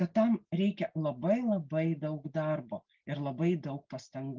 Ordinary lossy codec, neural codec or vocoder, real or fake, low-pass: Opus, 32 kbps; none; real; 7.2 kHz